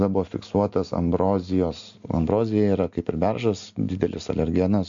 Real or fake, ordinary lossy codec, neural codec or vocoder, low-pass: real; MP3, 64 kbps; none; 7.2 kHz